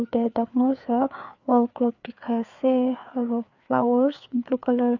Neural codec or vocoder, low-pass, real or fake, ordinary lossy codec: codec, 16 kHz, 4 kbps, FreqCodec, larger model; 7.2 kHz; fake; none